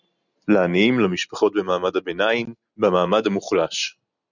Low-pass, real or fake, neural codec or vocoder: 7.2 kHz; real; none